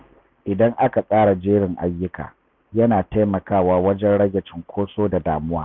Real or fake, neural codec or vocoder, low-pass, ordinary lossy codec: real; none; none; none